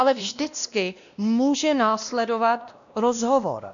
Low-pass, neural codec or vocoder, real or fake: 7.2 kHz; codec, 16 kHz, 1 kbps, X-Codec, WavLM features, trained on Multilingual LibriSpeech; fake